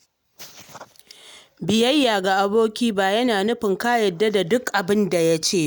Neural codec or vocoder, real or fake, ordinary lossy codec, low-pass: none; real; none; none